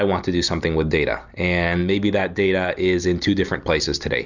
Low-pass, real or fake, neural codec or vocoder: 7.2 kHz; real; none